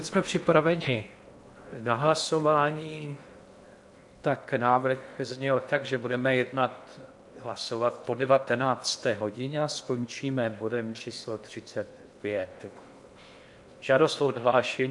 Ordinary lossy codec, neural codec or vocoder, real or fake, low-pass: MP3, 64 kbps; codec, 16 kHz in and 24 kHz out, 0.8 kbps, FocalCodec, streaming, 65536 codes; fake; 10.8 kHz